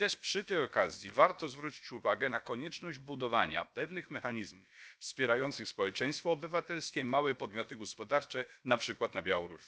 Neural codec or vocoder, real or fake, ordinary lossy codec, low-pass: codec, 16 kHz, about 1 kbps, DyCAST, with the encoder's durations; fake; none; none